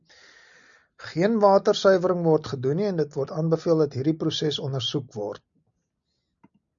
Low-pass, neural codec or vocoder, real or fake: 7.2 kHz; none; real